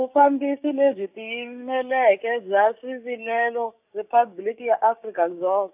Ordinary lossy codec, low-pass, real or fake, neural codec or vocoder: AAC, 32 kbps; 3.6 kHz; fake; codec, 16 kHz, 6 kbps, DAC